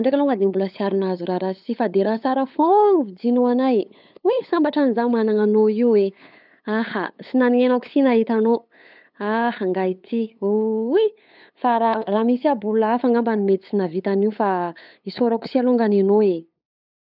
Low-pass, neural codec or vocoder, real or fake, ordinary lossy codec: 5.4 kHz; codec, 16 kHz, 8 kbps, FunCodec, trained on LibriTTS, 25 frames a second; fake; none